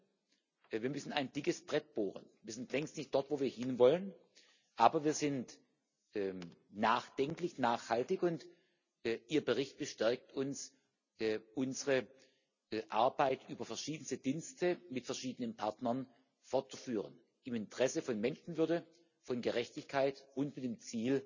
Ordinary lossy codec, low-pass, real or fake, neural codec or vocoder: none; 7.2 kHz; real; none